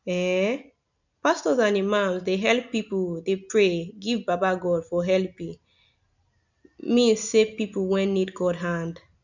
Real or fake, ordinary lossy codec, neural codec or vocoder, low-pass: real; none; none; 7.2 kHz